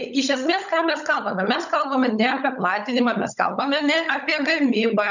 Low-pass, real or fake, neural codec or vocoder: 7.2 kHz; fake; codec, 16 kHz, 16 kbps, FunCodec, trained on LibriTTS, 50 frames a second